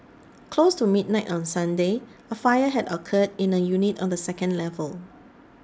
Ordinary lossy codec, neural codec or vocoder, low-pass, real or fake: none; none; none; real